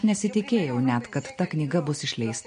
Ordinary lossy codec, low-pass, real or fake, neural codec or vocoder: MP3, 48 kbps; 9.9 kHz; real; none